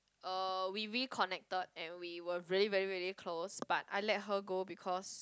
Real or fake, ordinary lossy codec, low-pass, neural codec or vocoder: real; none; none; none